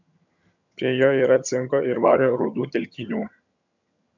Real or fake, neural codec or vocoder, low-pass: fake; vocoder, 22.05 kHz, 80 mel bands, HiFi-GAN; 7.2 kHz